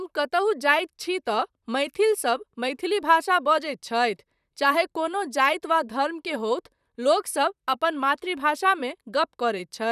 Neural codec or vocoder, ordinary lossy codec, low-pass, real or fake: vocoder, 44.1 kHz, 128 mel bands, Pupu-Vocoder; none; 14.4 kHz; fake